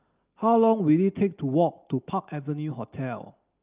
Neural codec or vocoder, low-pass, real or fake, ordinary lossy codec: none; 3.6 kHz; real; Opus, 32 kbps